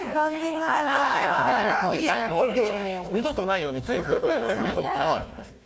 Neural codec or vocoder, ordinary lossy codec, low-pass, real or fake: codec, 16 kHz, 1 kbps, FunCodec, trained on Chinese and English, 50 frames a second; none; none; fake